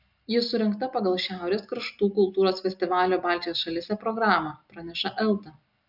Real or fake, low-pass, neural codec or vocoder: real; 5.4 kHz; none